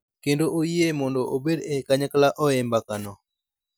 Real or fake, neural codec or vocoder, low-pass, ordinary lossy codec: real; none; none; none